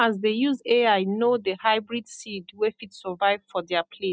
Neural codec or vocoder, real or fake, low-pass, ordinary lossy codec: none; real; none; none